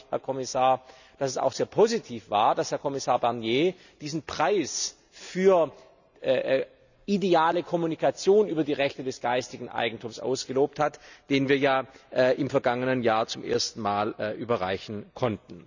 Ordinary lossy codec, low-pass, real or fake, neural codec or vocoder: none; 7.2 kHz; real; none